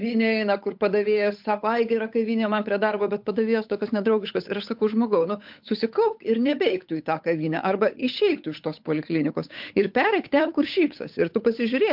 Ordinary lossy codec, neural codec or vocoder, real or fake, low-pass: MP3, 48 kbps; codec, 16 kHz, 8 kbps, FunCodec, trained on Chinese and English, 25 frames a second; fake; 5.4 kHz